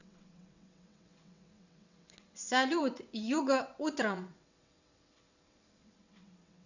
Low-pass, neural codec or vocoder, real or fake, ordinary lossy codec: 7.2 kHz; vocoder, 22.05 kHz, 80 mel bands, WaveNeXt; fake; none